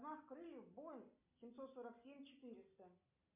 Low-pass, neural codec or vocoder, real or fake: 3.6 kHz; none; real